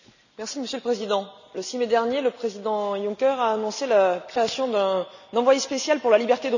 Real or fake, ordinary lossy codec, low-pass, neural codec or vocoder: real; none; 7.2 kHz; none